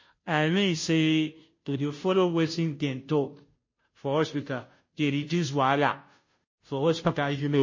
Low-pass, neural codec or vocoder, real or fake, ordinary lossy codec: 7.2 kHz; codec, 16 kHz, 0.5 kbps, FunCodec, trained on Chinese and English, 25 frames a second; fake; MP3, 32 kbps